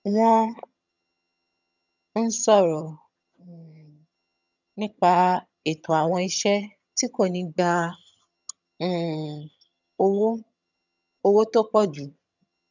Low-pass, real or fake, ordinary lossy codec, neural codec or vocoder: 7.2 kHz; fake; none; vocoder, 22.05 kHz, 80 mel bands, HiFi-GAN